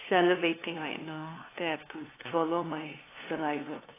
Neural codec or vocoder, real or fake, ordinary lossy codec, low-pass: codec, 16 kHz, 2 kbps, FunCodec, trained on LibriTTS, 25 frames a second; fake; AAC, 16 kbps; 3.6 kHz